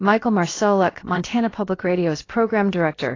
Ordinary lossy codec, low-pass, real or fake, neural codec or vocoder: AAC, 32 kbps; 7.2 kHz; fake; codec, 16 kHz, about 1 kbps, DyCAST, with the encoder's durations